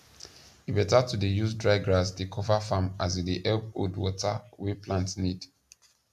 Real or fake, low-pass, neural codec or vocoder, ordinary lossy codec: real; 14.4 kHz; none; none